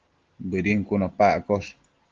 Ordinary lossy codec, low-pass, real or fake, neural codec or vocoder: Opus, 16 kbps; 7.2 kHz; real; none